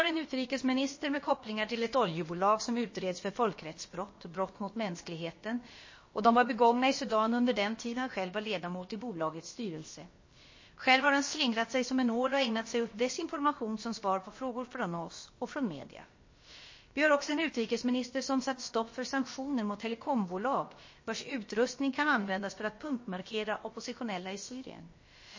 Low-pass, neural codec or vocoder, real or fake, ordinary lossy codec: 7.2 kHz; codec, 16 kHz, about 1 kbps, DyCAST, with the encoder's durations; fake; MP3, 32 kbps